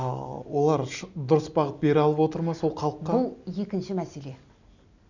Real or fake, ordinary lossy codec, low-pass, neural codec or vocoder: real; none; 7.2 kHz; none